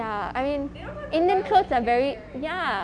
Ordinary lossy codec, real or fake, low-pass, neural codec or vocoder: MP3, 64 kbps; real; 9.9 kHz; none